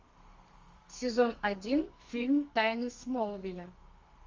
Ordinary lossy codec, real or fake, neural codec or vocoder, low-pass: Opus, 32 kbps; fake; codec, 32 kHz, 1.9 kbps, SNAC; 7.2 kHz